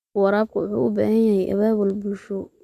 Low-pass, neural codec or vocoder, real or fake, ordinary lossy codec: 14.4 kHz; none; real; Opus, 64 kbps